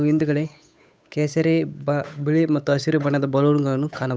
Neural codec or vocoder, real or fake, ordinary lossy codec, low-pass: codec, 16 kHz, 8 kbps, FunCodec, trained on Chinese and English, 25 frames a second; fake; none; none